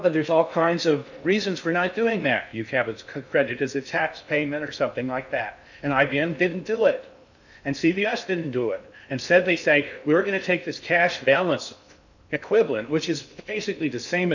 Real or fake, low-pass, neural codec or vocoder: fake; 7.2 kHz; codec, 16 kHz in and 24 kHz out, 0.6 kbps, FocalCodec, streaming, 2048 codes